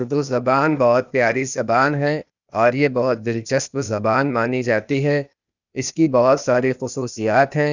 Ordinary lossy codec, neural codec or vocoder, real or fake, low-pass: none; codec, 16 kHz, 0.8 kbps, ZipCodec; fake; 7.2 kHz